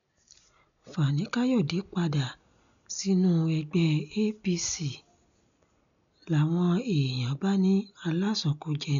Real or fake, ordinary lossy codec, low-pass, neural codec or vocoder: real; none; 7.2 kHz; none